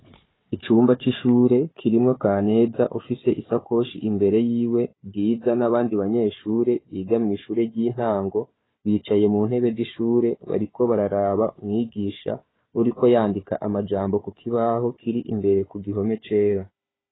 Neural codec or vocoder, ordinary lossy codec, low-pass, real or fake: codec, 16 kHz, 4 kbps, FunCodec, trained on Chinese and English, 50 frames a second; AAC, 16 kbps; 7.2 kHz; fake